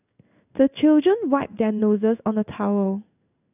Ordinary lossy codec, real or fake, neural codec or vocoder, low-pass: none; fake; codec, 16 kHz in and 24 kHz out, 1 kbps, XY-Tokenizer; 3.6 kHz